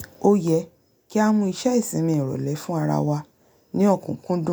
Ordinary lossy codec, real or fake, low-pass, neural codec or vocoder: none; real; none; none